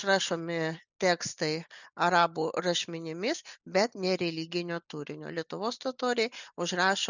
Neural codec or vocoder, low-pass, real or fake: none; 7.2 kHz; real